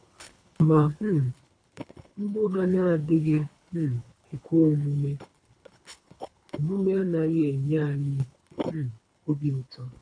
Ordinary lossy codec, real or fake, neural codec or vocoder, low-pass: AAC, 64 kbps; fake; codec, 24 kHz, 3 kbps, HILCodec; 9.9 kHz